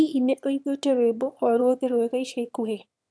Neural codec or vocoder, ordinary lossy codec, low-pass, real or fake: autoencoder, 22.05 kHz, a latent of 192 numbers a frame, VITS, trained on one speaker; none; none; fake